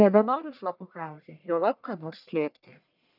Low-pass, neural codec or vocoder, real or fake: 5.4 kHz; codec, 44.1 kHz, 1.7 kbps, Pupu-Codec; fake